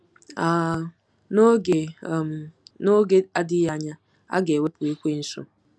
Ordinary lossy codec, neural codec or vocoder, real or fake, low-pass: none; none; real; none